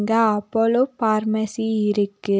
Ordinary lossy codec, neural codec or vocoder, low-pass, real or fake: none; none; none; real